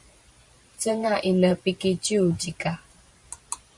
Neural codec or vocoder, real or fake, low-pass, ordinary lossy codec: vocoder, 44.1 kHz, 128 mel bands every 512 samples, BigVGAN v2; fake; 10.8 kHz; Opus, 64 kbps